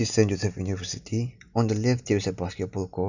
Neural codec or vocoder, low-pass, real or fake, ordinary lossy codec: none; 7.2 kHz; real; none